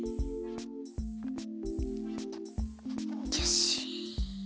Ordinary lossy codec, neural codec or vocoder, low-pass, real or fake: none; none; none; real